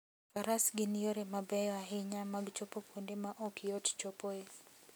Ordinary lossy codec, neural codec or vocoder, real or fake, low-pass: none; none; real; none